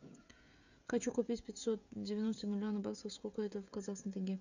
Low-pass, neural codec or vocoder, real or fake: 7.2 kHz; codec, 16 kHz, 16 kbps, FreqCodec, smaller model; fake